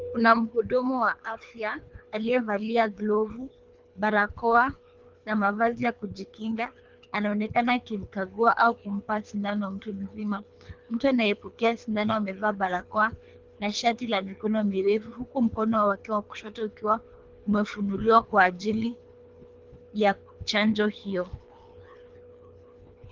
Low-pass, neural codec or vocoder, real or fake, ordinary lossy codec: 7.2 kHz; codec, 24 kHz, 3 kbps, HILCodec; fake; Opus, 32 kbps